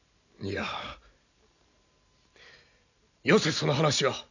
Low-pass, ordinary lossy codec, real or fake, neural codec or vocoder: 7.2 kHz; none; real; none